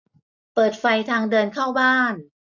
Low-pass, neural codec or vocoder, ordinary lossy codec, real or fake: 7.2 kHz; none; none; real